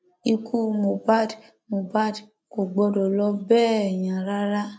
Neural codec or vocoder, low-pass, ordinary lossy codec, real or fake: none; none; none; real